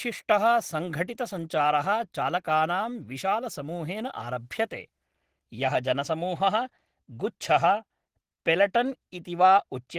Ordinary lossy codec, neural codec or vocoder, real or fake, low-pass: Opus, 16 kbps; none; real; 14.4 kHz